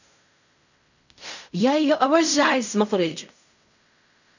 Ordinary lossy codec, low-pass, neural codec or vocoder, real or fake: none; 7.2 kHz; codec, 16 kHz in and 24 kHz out, 0.4 kbps, LongCat-Audio-Codec, fine tuned four codebook decoder; fake